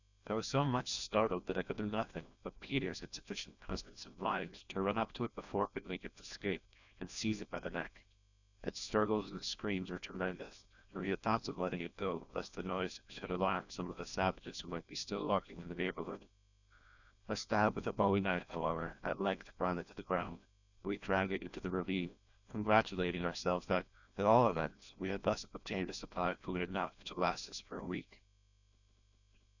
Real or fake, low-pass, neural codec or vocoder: fake; 7.2 kHz; codec, 16 kHz, 1 kbps, FreqCodec, larger model